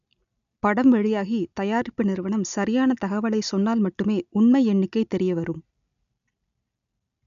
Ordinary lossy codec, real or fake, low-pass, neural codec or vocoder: none; real; 7.2 kHz; none